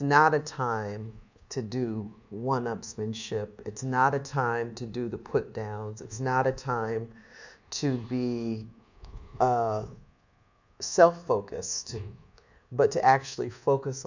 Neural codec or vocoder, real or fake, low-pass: codec, 24 kHz, 1.2 kbps, DualCodec; fake; 7.2 kHz